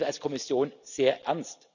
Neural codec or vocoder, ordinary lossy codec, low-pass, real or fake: none; none; 7.2 kHz; real